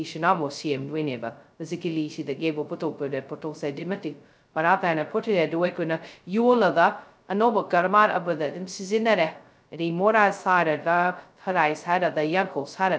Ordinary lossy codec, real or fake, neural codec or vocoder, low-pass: none; fake; codec, 16 kHz, 0.2 kbps, FocalCodec; none